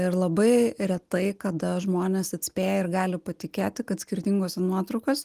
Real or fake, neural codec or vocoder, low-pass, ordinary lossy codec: real; none; 14.4 kHz; Opus, 24 kbps